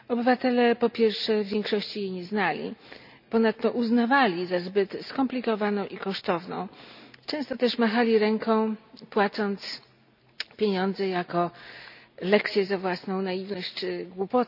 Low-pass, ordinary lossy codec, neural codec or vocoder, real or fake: 5.4 kHz; none; none; real